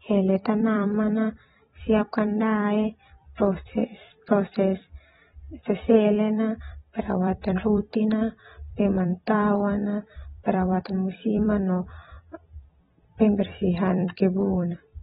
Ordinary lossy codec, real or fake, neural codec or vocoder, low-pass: AAC, 16 kbps; fake; vocoder, 44.1 kHz, 128 mel bands every 256 samples, BigVGAN v2; 19.8 kHz